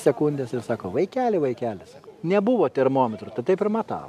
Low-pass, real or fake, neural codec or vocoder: 14.4 kHz; real; none